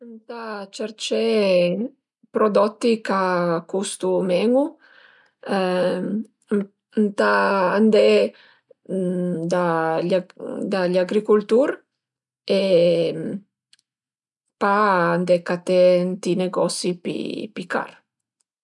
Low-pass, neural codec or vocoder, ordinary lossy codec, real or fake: 10.8 kHz; none; none; real